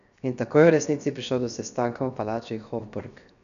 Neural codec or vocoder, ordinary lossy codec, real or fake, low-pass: codec, 16 kHz, 0.7 kbps, FocalCodec; none; fake; 7.2 kHz